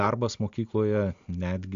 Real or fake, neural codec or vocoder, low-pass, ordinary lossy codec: real; none; 7.2 kHz; AAC, 64 kbps